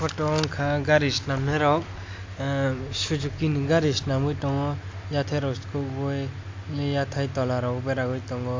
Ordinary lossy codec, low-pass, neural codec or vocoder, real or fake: MP3, 48 kbps; 7.2 kHz; none; real